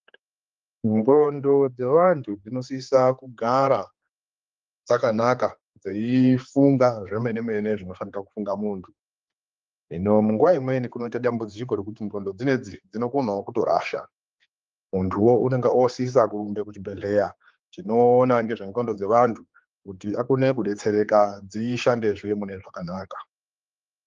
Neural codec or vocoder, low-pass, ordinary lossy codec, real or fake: codec, 16 kHz, 4 kbps, X-Codec, HuBERT features, trained on general audio; 7.2 kHz; Opus, 32 kbps; fake